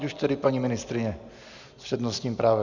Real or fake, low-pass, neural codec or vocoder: real; 7.2 kHz; none